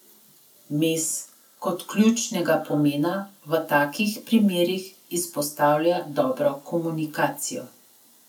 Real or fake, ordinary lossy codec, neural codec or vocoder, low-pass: real; none; none; none